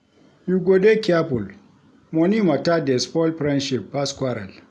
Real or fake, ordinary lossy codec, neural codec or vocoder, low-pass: real; none; none; none